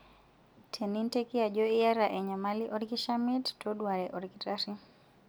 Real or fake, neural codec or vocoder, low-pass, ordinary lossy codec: real; none; none; none